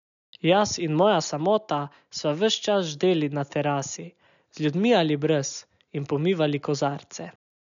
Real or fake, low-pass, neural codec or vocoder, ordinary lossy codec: real; 7.2 kHz; none; none